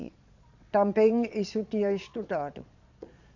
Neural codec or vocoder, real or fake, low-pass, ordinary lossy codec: vocoder, 22.05 kHz, 80 mel bands, Vocos; fake; 7.2 kHz; none